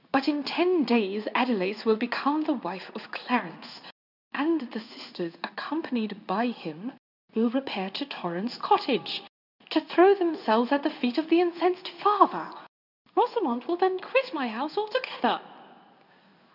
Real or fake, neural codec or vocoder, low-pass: fake; codec, 16 kHz in and 24 kHz out, 1 kbps, XY-Tokenizer; 5.4 kHz